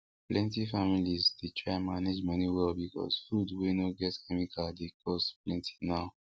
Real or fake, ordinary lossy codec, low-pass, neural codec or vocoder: real; none; none; none